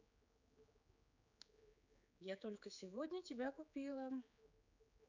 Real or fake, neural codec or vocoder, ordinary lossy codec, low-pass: fake; codec, 16 kHz, 4 kbps, X-Codec, HuBERT features, trained on general audio; none; 7.2 kHz